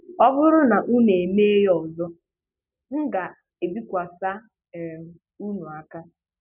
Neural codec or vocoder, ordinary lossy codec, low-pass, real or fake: none; none; 3.6 kHz; real